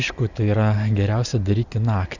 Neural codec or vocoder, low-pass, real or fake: none; 7.2 kHz; real